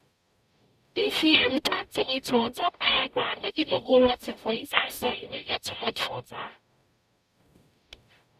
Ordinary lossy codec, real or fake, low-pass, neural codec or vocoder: none; fake; 14.4 kHz; codec, 44.1 kHz, 0.9 kbps, DAC